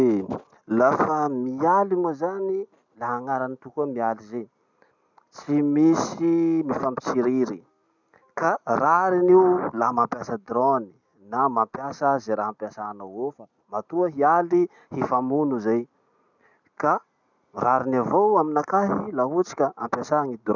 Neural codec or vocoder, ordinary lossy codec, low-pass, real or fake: none; none; 7.2 kHz; real